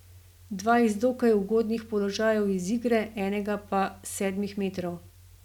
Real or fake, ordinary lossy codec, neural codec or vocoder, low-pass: real; none; none; 19.8 kHz